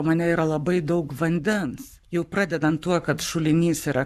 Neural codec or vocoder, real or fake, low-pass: codec, 44.1 kHz, 7.8 kbps, Pupu-Codec; fake; 14.4 kHz